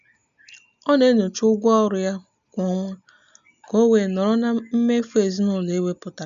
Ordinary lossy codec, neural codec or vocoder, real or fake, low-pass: none; none; real; 7.2 kHz